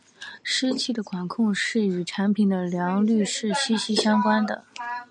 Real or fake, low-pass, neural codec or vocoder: real; 9.9 kHz; none